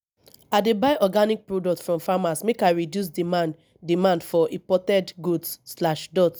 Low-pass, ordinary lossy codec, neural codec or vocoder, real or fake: none; none; none; real